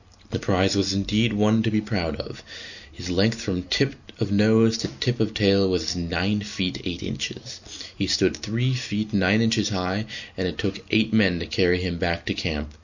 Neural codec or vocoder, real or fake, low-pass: none; real; 7.2 kHz